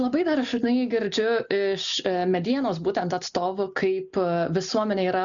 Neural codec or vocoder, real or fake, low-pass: none; real; 7.2 kHz